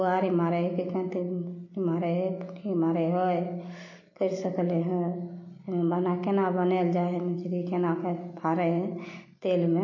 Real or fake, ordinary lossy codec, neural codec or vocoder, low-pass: real; MP3, 32 kbps; none; 7.2 kHz